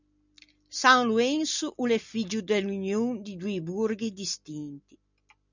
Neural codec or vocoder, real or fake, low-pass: none; real; 7.2 kHz